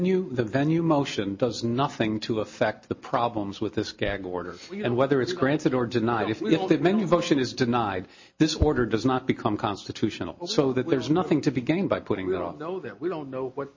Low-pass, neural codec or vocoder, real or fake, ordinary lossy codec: 7.2 kHz; none; real; MP3, 32 kbps